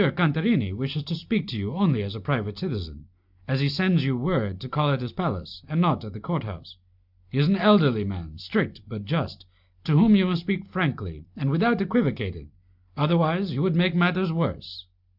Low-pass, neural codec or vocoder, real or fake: 5.4 kHz; none; real